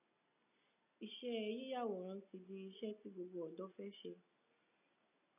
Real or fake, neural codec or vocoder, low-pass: real; none; 3.6 kHz